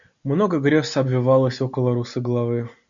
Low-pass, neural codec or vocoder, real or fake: 7.2 kHz; none; real